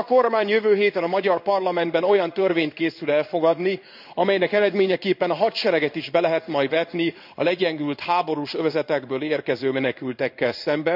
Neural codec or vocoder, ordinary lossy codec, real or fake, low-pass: codec, 16 kHz in and 24 kHz out, 1 kbps, XY-Tokenizer; none; fake; 5.4 kHz